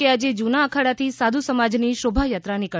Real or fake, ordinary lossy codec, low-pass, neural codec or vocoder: real; none; none; none